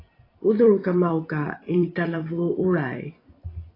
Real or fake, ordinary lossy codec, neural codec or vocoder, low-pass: fake; AAC, 24 kbps; vocoder, 44.1 kHz, 128 mel bands every 256 samples, BigVGAN v2; 5.4 kHz